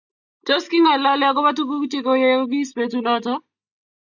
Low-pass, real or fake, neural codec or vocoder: 7.2 kHz; real; none